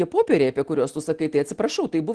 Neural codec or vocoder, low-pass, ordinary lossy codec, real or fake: none; 10.8 kHz; Opus, 16 kbps; real